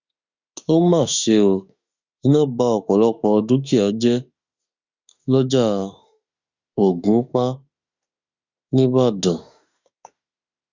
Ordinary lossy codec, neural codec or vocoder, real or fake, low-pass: Opus, 64 kbps; autoencoder, 48 kHz, 32 numbers a frame, DAC-VAE, trained on Japanese speech; fake; 7.2 kHz